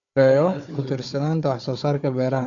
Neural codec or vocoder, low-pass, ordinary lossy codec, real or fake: codec, 16 kHz, 4 kbps, FunCodec, trained on Chinese and English, 50 frames a second; 7.2 kHz; none; fake